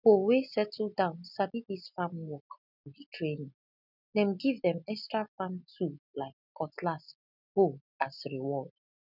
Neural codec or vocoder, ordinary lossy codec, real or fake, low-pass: none; none; real; 5.4 kHz